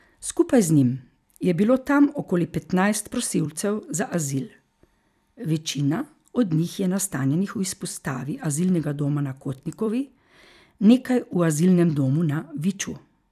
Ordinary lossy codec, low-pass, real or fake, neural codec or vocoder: none; 14.4 kHz; fake; vocoder, 44.1 kHz, 128 mel bands every 256 samples, BigVGAN v2